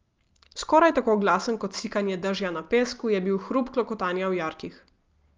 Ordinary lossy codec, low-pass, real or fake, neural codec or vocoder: Opus, 32 kbps; 7.2 kHz; real; none